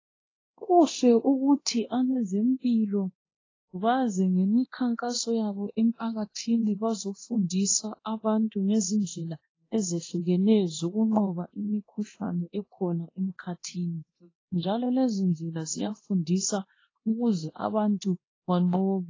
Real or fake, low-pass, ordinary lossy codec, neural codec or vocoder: fake; 7.2 kHz; AAC, 32 kbps; codec, 24 kHz, 0.9 kbps, DualCodec